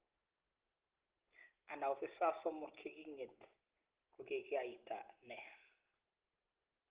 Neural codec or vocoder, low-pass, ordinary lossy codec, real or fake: none; 3.6 kHz; Opus, 16 kbps; real